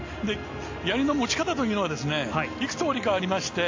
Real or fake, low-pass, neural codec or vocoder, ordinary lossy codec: real; 7.2 kHz; none; AAC, 48 kbps